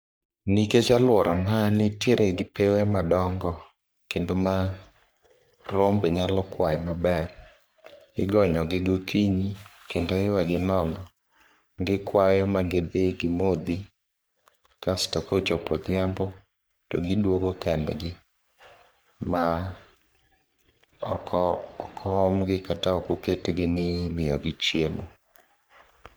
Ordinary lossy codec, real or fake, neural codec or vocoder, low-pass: none; fake; codec, 44.1 kHz, 3.4 kbps, Pupu-Codec; none